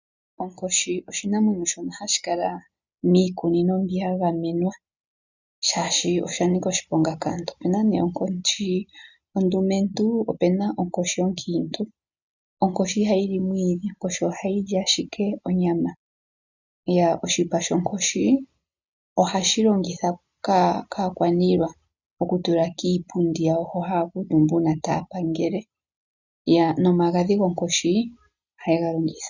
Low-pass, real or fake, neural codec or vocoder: 7.2 kHz; real; none